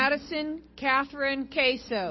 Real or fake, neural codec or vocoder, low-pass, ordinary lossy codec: real; none; 7.2 kHz; MP3, 24 kbps